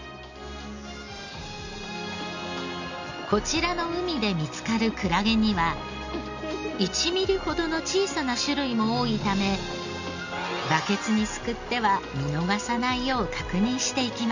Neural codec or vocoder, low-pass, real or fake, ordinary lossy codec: none; 7.2 kHz; real; none